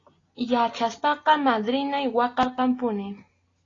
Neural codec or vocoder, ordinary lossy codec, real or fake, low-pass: none; AAC, 32 kbps; real; 7.2 kHz